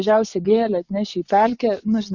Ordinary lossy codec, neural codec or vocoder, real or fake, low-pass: Opus, 64 kbps; vocoder, 24 kHz, 100 mel bands, Vocos; fake; 7.2 kHz